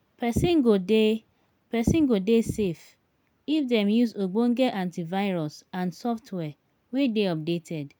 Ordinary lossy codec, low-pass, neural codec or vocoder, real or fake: none; none; none; real